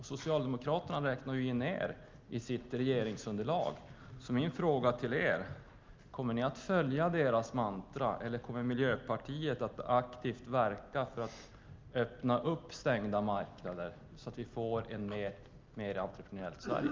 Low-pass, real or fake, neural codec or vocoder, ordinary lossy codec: 7.2 kHz; real; none; Opus, 24 kbps